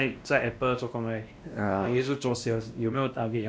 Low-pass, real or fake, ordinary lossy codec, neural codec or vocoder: none; fake; none; codec, 16 kHz, 1 kbps, X-Codec, WavLM features, trained on Multilingual LibriSpeech